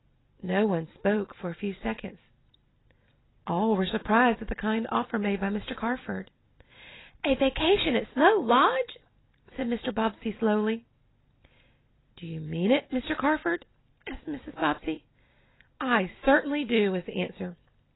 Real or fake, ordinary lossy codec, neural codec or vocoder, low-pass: real; AAC, 16 kbps; none; 7.2 kHz